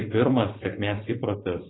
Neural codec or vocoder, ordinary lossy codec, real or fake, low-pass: codec, 16 kHz, 4.8 kbps, FACodec; AAC, 16 kbps; fake; 7.2 kHz